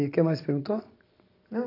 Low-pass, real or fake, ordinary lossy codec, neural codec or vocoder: 5.4 kHz; real; AAC, 32 kbps; none